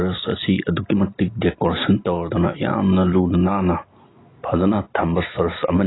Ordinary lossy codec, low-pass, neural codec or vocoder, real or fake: AAC, 16 kbps; 7.2 kHz; none; real